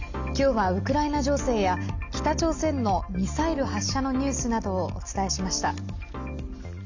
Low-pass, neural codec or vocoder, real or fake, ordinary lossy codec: 7.2 kHz; none; real; none